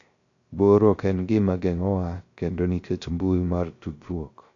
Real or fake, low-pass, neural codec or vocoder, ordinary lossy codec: fake; 7.2 kHz; codec, 16 kHz, 0.3 kbps, FocalCodec; AAC, 48 kbps